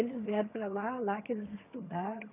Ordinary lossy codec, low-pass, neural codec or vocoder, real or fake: none; 3.6 kHz; vocoder, 22.05 kHz, 80 mel bands, HiFi-GAN; fake